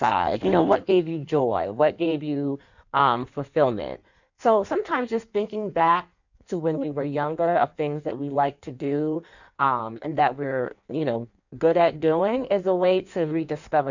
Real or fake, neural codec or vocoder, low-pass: fake; codec, 16 kHz in and 24 kHz out, 1.1 kbps, FireRedTTS-2 codec; 7.2 kHz